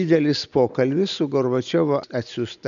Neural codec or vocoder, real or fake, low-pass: none; real; 7.2 kHz